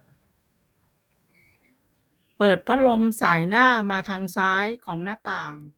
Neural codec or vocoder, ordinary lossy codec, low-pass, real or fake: codec, 44.1 kHz, 2.6 kbps, DAC; none; 19.8 kHz; fake